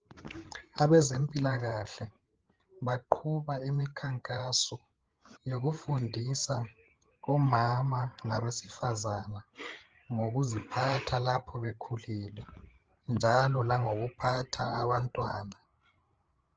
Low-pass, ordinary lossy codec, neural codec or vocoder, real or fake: 7.2 kHz; Opus, 16 kbps; codec, 16 kHz, 8 kbps, FreqCodec, larger model; fake